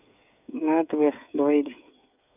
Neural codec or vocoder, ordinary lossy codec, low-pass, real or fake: codec, 16 kHz, 8 kbps, FreqCodec, smaller model; none; 3.6 kHz; fake